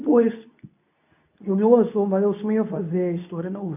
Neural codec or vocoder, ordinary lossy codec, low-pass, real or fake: codec, 24 kHz, 0.9 kbps, WavTokenizer, medium speech release version 2; none; 3.6 kHz; fake